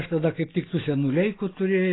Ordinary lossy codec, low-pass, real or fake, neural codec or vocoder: AAC, 16 kbps; 7.2 kHz; real; none